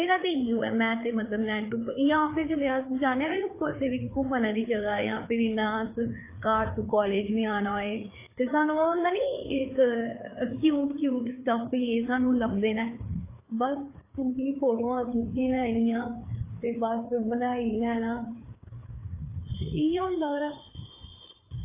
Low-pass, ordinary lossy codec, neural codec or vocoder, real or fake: 3.6 kHz; AAC, 24 kbps; codec, 16 kHz, 4 kbps, FreqCodec, larger model; fake